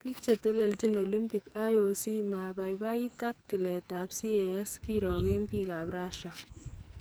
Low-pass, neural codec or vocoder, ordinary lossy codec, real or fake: none; codec, 44.1 kHz, 2.6 kbps, SNAC; none; fake